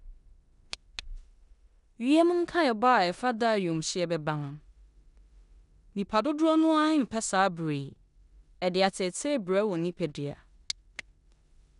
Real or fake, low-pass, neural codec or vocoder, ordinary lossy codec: fake; 10.8 kHz; codec, 16 kHz in and 24 kHz out, 0.9 kbps, LongCat-Audio-Codec, four codebook decoder; none